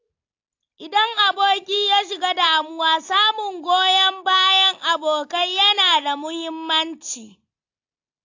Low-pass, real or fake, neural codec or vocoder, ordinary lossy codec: 7.2 kHz; real; none; AAC, 48 kbps